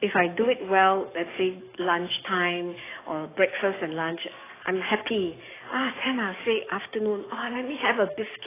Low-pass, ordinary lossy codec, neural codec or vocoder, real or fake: 3.6 kHz; AAC, 16 kbps; codec, 44.1 kHz, 7.8 kbps, DAC; fake